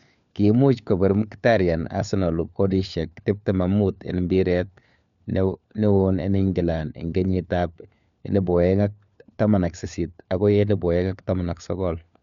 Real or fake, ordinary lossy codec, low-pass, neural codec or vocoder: fake; none; 7.2 kHz; codec, 16 kHz, 4 kbps, FunCodec, trained on LibriTTS, 50 frames a second